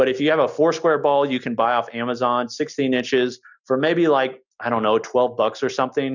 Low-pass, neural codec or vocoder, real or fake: 7.2 kHz; none; real